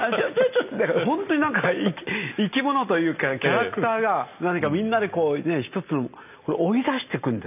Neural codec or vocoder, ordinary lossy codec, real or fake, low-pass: none; none; real; 3.6 kHz